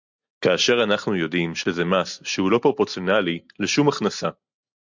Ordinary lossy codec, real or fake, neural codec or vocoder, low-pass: MP3, 64 kbps; real; none; 7.2 kHz